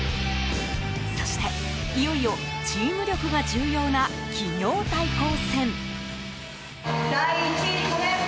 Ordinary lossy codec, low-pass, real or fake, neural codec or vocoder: none; none; real; none